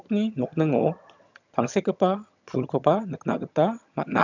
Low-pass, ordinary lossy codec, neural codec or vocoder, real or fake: 7.2 kHz; none; vocoder, 22.05 kHz, 80 mel bands, HiFi-GAN; fake